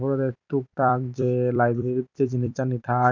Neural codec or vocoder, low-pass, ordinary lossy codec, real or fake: vocoder, 44.1 kHz, 128 mel bands every 256 samples, BigVGAN v2; 7.2 kHz; none; fake